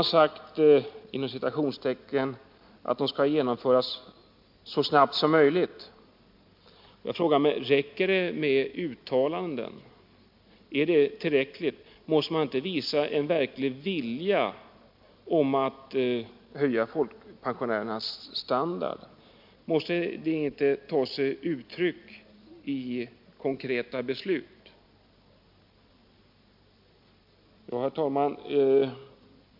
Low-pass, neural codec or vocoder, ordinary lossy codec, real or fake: 5.4 kHz; none; MP3, 48 kbps; real